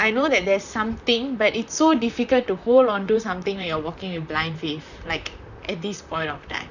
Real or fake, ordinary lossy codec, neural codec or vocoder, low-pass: fake; none; vocoder, 44.1 kHz, 128 mel bands, Pupu-Vocoder; 7.2 kHz